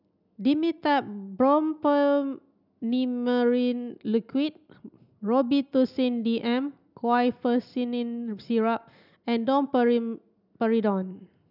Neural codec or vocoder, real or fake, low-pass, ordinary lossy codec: none; real; 5.4 kHz; none